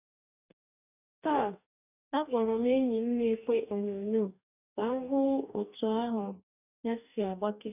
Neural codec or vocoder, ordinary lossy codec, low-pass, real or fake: codec, 44.1 kHz, 2.6 kbps, DAC; none; 3.6 kHz; fake